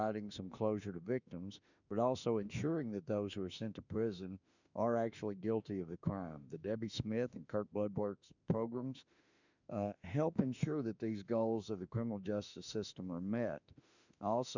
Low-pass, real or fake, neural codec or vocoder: 7.2 kHz; fake; autoencoder, 48 kHz, 32 numbers a frame, DAC-VAE, trained on Japanese speech